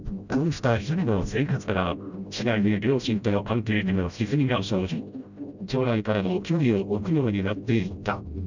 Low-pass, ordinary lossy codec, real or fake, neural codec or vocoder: 7.2 kHz; none; fake; codec, 16 kHz, 0.5 kbps, FreqCodec, smaller model